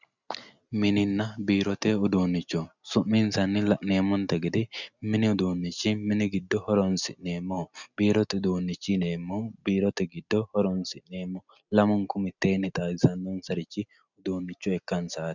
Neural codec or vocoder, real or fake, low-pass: none; real; 7.2 kHz